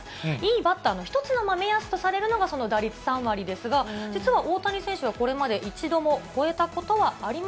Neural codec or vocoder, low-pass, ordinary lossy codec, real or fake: none; none; none; real